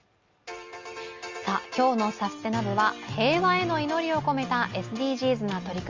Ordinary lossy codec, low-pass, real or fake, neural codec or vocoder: Opus, 32 kbps; 7.2 kHz; real; none